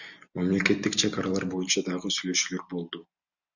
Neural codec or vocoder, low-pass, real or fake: none; 7.2 kHz; real